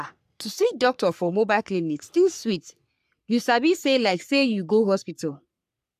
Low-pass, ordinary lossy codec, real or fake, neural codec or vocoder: 14.4 kHz; AAC, 96 kbps; fake; codec, 44.1 kHz, 3.4 kbps, Pupu-Codec